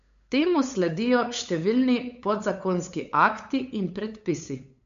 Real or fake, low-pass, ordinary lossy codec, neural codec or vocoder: fake; 7.2 kHz; MP3, 64 kbps; codec, 16 kHz, 8 kbps, FunCodec, trained on LibriTTS, 25 frames a second